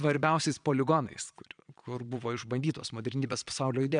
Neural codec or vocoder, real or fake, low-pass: vocoder, 22.05 kHz, 80 mel bands, Vocos; fake; 9.9 kHz